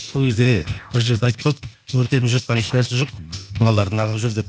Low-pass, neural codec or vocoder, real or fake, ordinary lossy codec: none; codec, 16 kHz, 0.8 kbps, ZipCodec; fake; none